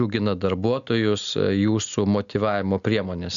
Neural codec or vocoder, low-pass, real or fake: none; 7.2 kHz; real